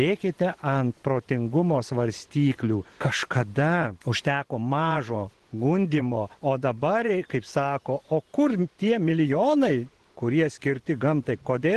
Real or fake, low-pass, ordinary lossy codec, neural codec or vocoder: fake; 10.8 kHz; Opus, 16 kbps; vocoder, 24 kHz, 100 mel bands, Vocos